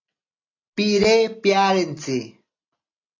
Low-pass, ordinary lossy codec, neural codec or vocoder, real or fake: 7.2 kHz; AAC, 32 kbps; none; real